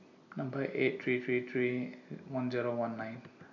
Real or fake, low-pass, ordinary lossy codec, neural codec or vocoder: real; 7.2 kHz; AAC, 48 kbps; none